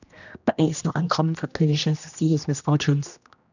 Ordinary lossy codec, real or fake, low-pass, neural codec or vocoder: none; fake; 7.2 kHz; codec, 16 kHz, 1 kbps, X-Codec, HuBERT features, trained on general audio